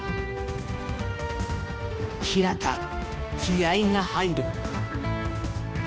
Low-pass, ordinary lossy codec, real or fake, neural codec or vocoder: none; none; fake; codec, 16 kHz, 1 kbps, X-Codec, HuBERT features, trained on balanced general audio